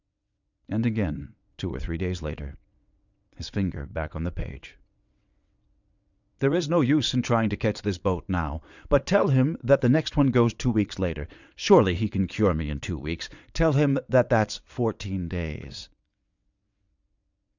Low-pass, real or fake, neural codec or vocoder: 7.2 kHz; fake; vocoder, 22.05 kHz, 80 mel bands, WaveNeXt